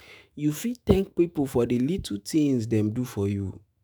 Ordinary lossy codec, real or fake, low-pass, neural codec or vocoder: none; fake; none; autoencoder, 48 kHz, 128 numbers a frame, DAC-VAE, trained on Japanese speech